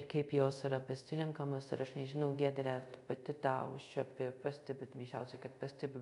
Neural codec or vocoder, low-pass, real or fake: codec, 24 kHz, 0.5 kbps, DualCodec; 10.8 kHz; fake